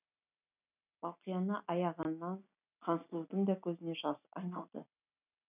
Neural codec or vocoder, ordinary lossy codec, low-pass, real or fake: none; none; 3.6 kHz; real